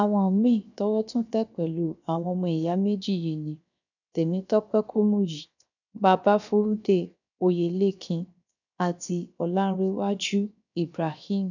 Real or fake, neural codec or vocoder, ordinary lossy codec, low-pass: fake; codec, 16 kHz, 0.7 kbps, FocalCodec; none; 7.2 kHz